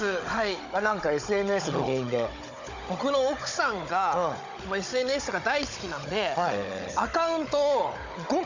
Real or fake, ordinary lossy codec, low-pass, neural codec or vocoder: fake; Opus, 64 kbps; 7.2 kHz; codec, 16 kHz, 16 kbps, FunCodec, trained on Chinese and English, 50 frames a second